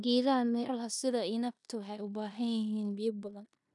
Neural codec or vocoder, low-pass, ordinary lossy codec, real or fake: codec, 16 kHz in and 24 kHz out, 0.9 kbps, LongCat-Audio-Codec, four codebook decoder; 10.8 kHz; none; fake